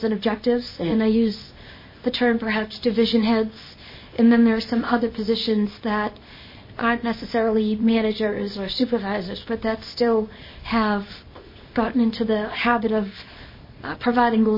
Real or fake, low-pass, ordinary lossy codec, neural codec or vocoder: fake; 5.4 kHz; MP3, 24 kbps; codec, 24 kHz, 0.9 kbps, WavTokenizer, small release